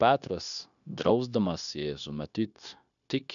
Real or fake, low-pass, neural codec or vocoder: fake; 7.2 kHz; codec, 16 kHz, 0.9 kbps, LongCat-Audio-Codec